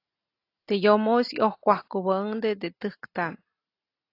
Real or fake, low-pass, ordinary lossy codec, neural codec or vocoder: real; 5.4 kHz; AAC, 32 kbps; none